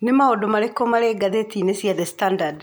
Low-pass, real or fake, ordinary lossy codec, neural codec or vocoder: none; real; none; none